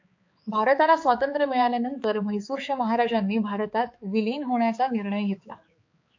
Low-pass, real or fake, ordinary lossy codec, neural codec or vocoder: 7.2 kHz; fake; AAC, 48 kbps; codec, 16 kHz, 4 kbps, X-Codec, HuBERT features, trained on balanced general audio